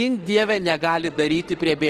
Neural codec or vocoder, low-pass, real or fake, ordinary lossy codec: autoencoder, 48 kHz, 32 numbers a frame, DAC-VAE, trained on Japanese speech; 14.4 kHz; fake; Opus, 16 kbps